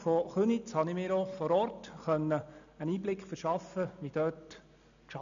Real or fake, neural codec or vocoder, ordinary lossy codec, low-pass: real; none; none; 7.2 kHz